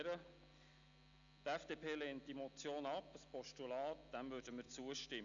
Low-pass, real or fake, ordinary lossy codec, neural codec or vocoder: 7.2 kHz; real; none; none